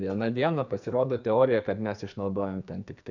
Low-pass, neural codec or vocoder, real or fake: 7.2 kHz; codec, 44.1 kHz, 2.6 kbps, SNAC; fake